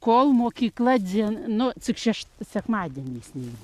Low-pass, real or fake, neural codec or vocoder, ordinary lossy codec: 14.4 kHz; real; none; Opus, 64 kbps